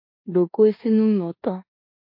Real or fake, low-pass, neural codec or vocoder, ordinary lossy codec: fake; 5.4 kHz; codec, 16 kHz in and 24 kHz out, 0.9 kbps, LongCat-Audio-Codec, four codebook decoder; MP3, 32 kbps